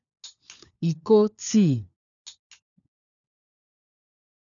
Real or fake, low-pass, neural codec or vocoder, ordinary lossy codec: fake; 7.2 kHz; codec, 16 kHz, 4 kbps, FunCodec, trained on LibriTTS, 50 frames a second; none